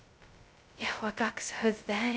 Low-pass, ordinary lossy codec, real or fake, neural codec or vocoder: none; none; fake; codec, 16 kHz, 0.2 kbps, FocalCodec